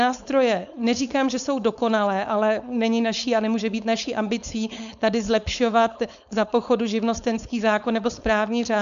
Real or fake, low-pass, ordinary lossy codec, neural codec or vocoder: fake; 7.2 kHz; MP3, 96 kbps; codec, 16 kHz, 4.8 kbps, FACodec